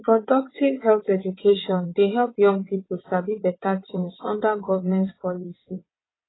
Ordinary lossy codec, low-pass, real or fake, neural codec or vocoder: AAC, 16 kbps; 7.2 kHz; fake; vocoder, 22.05 kHz, 80 mel bands, WaveNeXt